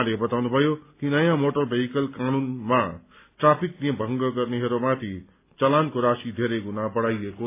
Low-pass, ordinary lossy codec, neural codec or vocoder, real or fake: 3.6 kHz; AAC, 24 kbps; none; real